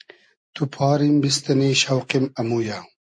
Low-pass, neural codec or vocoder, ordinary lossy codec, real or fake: 9.9 kHz; none; MP3, 48 kbps; real